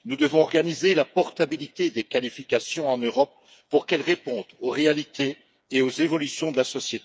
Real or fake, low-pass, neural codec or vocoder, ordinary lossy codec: fake; none; codec, 16 kHz, 4 kbps, FreqCodec, smaller model; none